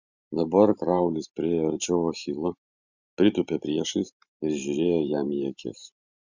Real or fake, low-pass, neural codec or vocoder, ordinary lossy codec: fake; 7.2 kHz; vocoder, 44.1 kHz, 128 mel bands every 512 samples, BigVGAN v2; Opus, 64 kbps